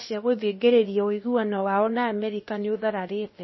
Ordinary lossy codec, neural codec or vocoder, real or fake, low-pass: MP3, 24 kbps; codec, 16 kHz, about 1 kbps, DyCAST, with the encoder's durations; fake; 7.2 kHz